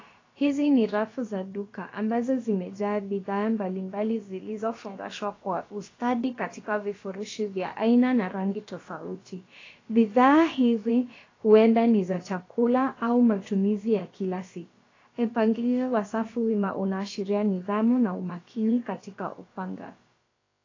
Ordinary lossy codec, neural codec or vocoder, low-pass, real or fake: AAC, 32 kbps; codec, 16 kHz, about 1 kbps, DyCAST, with the encoder's durations; 7.2 kHz; fake